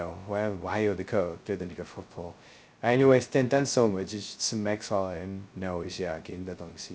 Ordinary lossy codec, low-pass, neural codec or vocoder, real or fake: none; none; codec, 16 kHz, 0.2 kbps, FocalCodec; fake